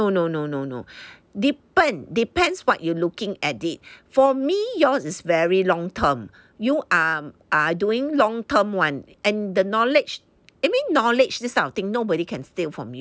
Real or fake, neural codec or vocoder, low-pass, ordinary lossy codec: real; none; none; none